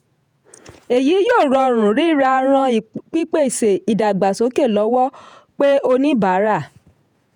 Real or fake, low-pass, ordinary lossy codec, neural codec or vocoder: fake; 19.8 kHz; none; vocoder, 48 kHz, 128 mel bands, Vocos